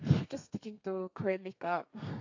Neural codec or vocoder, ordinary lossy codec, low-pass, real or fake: codec, 32 kHz, 1.9 kbps, SNAC; AAC, 48 kbps; 7.2 kHz; fake